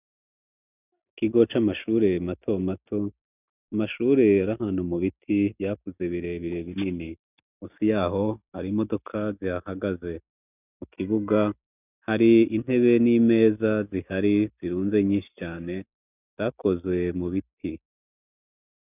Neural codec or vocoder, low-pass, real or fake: none; 3.6 kHz; real